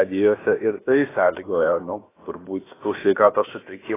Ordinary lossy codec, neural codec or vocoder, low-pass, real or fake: AAC, 16 kbps; codec, 16 kHz, about 1 kbps, DyCAST, with the encoder's durations; 3.6 kHz; fake